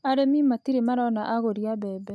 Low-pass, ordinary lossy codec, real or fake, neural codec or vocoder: none; none; real; none